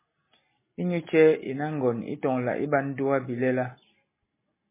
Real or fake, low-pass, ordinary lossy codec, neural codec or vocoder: real; 3.6 kHz; MP3, 16 kbps; none